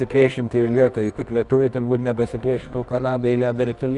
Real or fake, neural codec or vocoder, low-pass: fake; codec, 24 kHz, 0.9 kbps, WavTokenizer, medium music audio release; 10.8 kHz